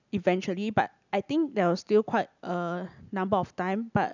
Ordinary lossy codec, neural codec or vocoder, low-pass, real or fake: none; none; 7.2 kHz; real